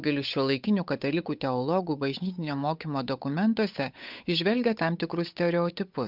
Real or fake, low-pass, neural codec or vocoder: fake; 5.4 kHz; codec, 16 kHz, 8 kbps, FunCodec, trained on Chinese and English, 25 frames a second